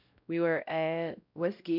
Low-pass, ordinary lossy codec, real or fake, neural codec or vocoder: 5.4 kHz; none; fake; codec, 16 kHz, 0.5 kbps, X-Codec, WavLM features, trained on Multilingual LibriSpeech